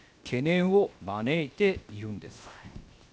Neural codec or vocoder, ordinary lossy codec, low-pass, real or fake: codec, 16 kHz, 0.7 kbps, FocalCodec; none; none; fake